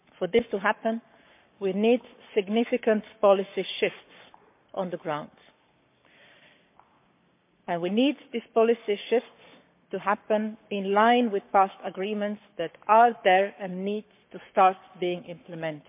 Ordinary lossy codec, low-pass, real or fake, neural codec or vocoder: MP3, 32 kbps; 3.6 kHz; fake; codec, 44.1 kHz, 7.8 kbps, Pupu-Codec